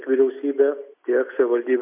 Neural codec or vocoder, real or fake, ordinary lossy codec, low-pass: none; real; MP3, 32 kbps; 3.6 kHz